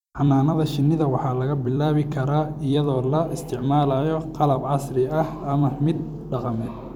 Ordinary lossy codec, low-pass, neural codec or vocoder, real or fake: MP3, 96 kbps; 19.8 kHz; vocoder, 44.1 kHz, 128 mel bands every 256 samples, BigVGAN v2; fake